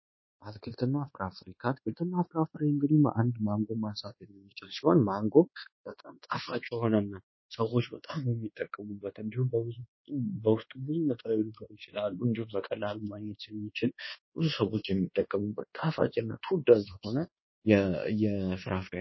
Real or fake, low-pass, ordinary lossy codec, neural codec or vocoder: fake; 7.2 kHz; MP3, 24 kbps; codec, 24 kHz, 1.2 kbps, DualCodec